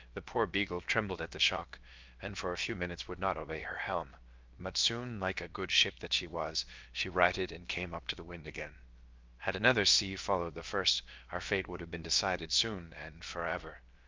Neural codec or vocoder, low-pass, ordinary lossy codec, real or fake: codec, 16 kHz, about 1 kbps, DyCAST, with the encoder's durations; 7.2 kHz; Opus, 24 kbps; fake